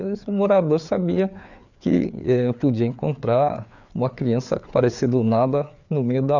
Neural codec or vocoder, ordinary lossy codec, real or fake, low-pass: codec, 16 kHz, 4 kbps, FreqCodec, larger model; none; fake; 7.2 kHz